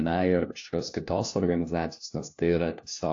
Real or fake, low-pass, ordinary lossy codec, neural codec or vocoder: fake; 7.2 kHz; AAC, 48 kbps; codec, 16 kHz, 1 kbps, FunCodec, trained on LibriTTS, 50 frames a second